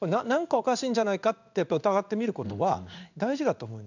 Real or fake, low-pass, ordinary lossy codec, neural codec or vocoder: fake; 7.2 kHz; none; codec, 16 kHz in and 24 kHz out, 1 kbps, XY-Tokenizer